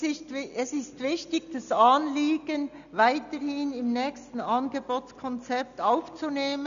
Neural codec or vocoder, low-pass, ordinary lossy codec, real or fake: none; 7.2 kHz; MP3, 96 kbps; real